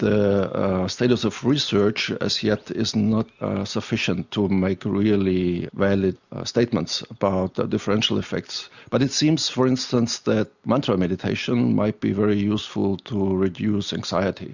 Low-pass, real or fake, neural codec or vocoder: 7.2 kHz; real; none